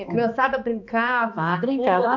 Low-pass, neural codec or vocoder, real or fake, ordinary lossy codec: 7.2 kHz; codec, 16 kHz, 2 kbps, X-Codec, HuBERT features, trained on balanced general audio; fake; none